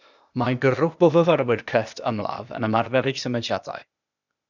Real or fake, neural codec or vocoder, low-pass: fake; codec, 16 kHz, 0.8 kbps, ZipCodec; 7.2 kHz